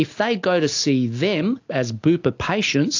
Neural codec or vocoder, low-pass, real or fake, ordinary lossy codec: none; 7.2 kHz; real; AAC, 48 kbps